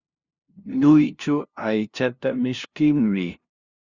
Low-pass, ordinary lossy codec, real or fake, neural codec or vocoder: 7.2 kHz; Opus, 64 kbps; fake; codec, 16 kHz, 0.5 kbps, FunCodec, trained on LibriTTS, 25 frames a second